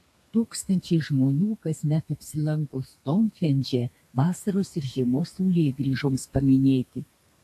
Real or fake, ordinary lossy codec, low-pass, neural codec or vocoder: fake; AAC, 64 kbps; 14.4 kHz; codec, 32 kHz, 1.9 kbps, SNAC